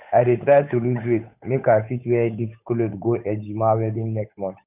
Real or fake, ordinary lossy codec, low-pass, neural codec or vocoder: fake; none; 3.6 kHz; codec, 16 kHz, 8 kbps, FunCodec, trained on Chinese and English, 25 frames a second